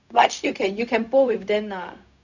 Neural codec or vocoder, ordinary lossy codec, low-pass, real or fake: codec, 16 kHz, 0.4 kbps, LongCat-Audio-Codec; none; 7.2 kHz; fake